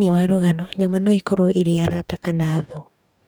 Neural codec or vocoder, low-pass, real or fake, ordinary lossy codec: codec, 44.1 kHz, 2.6 kbps, DAC; none; fake; none